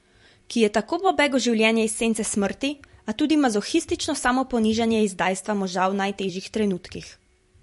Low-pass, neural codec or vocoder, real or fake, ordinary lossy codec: 14.4 kHz; vocoder, 44.1 kHz, 128 mel bands every 256 samples, BigVGAN v2; fake; MP3, 48 kbps